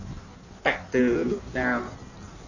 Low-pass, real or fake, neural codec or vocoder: 7.2 kHz; fake; codec, 16 kHz in and 24 kHz out, 1.1 kbps, FireRedTTS-2 codec